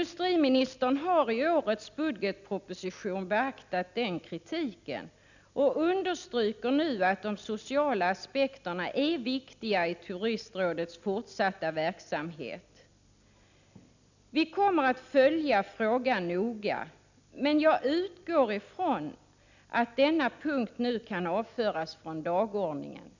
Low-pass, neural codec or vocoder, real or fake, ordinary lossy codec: 7.2 kHz; none; real; none